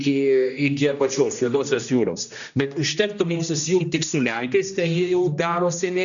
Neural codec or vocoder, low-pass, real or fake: codec, 16 kHz, 1 kbps, X-Codec, HuBERT features, trained on general audio; 7.2 kHz; fake